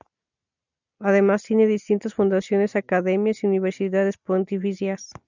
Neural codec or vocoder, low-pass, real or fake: none; 7.2 kHz; real